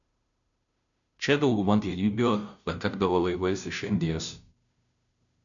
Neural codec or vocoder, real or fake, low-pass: codec, 16 kHz, 0.5 kbps, FunCodec, trained on Chinese and English, 25 frames a second; fake; 7.2 kHz